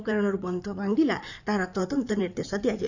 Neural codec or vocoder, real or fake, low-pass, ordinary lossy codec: codec, 16 kHz in and 24 kHz out, 2.2 kbps, FireRedTTS-2 codec; fake; 7.2 kHz; none